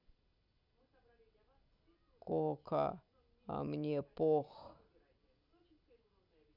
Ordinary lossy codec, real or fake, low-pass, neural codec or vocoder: Opus, 64 kbps; real; 5.4 kHz; none